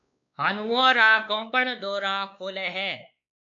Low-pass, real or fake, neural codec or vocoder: 7.2 kHz; fake; codec, 16 kHz, 2 kbps, X-Codec, WavLM features, trained on Multilingual LibriSpeech